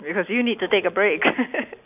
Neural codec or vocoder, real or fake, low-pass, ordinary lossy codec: none; real; 3.6 kHz; none